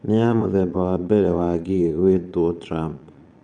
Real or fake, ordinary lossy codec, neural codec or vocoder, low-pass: fake; none; vocoder, 22.05 kHz, 80 mel bands, Vocos; 9.9 kHz